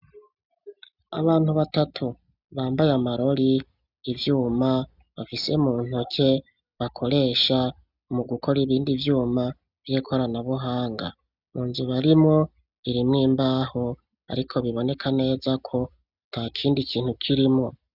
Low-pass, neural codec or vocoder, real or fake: 5.4 kHz; none; real